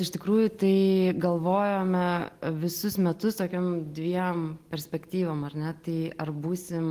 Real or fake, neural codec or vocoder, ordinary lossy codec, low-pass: real; none; Opus, 24 kbps; 14.4 kHz